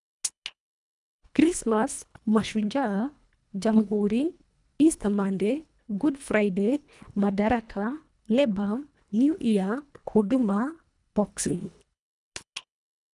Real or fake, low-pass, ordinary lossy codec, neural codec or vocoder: fake; 10.8 kHz; none; codec, 24 kHz, 1.5 kbps, HILCodec